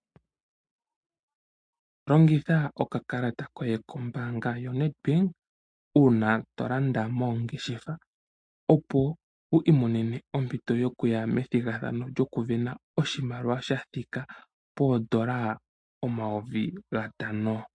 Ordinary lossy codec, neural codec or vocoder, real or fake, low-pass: MP3, 48 kbps; none; real; 9.9 kHz